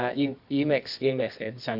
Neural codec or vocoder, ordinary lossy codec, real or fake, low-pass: codec, 24 kHz, 0.9 kbps, WavTokenizer, medium music audio release; none; fake; 5.4 kHz